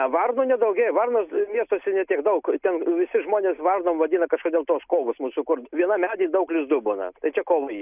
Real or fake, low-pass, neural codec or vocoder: real; 3.6 kHz; none